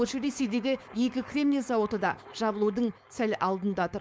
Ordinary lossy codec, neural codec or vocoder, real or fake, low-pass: none; codec, 16 kHz, 4.8 kbps, FACodec; fake; none